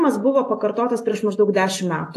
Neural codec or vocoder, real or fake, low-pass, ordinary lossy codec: none; real; 14.4 kHz; AAC, 48 kbps